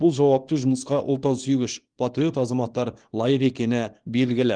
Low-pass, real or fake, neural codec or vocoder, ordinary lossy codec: 9.9 kHz; fake; codec, 24 kHz, 0.9 kbps, WavTokenizer, small release; Opus, 24 kbps